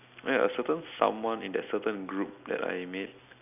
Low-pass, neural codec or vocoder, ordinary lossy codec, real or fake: 3.6 kHz; none; none; real